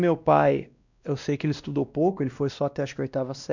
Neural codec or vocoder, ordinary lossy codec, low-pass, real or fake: codec, 16 kHz, 1 kbps, X-Codec, WavLM features, trained on Multilingual LibriSpeech; none; 7.2 kHz; fake